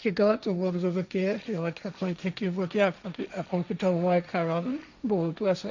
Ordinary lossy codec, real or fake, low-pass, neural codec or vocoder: none; fake; 7.2 kHz; codec, 16 kHz, 1.1 kbps, Voila-Tokenizer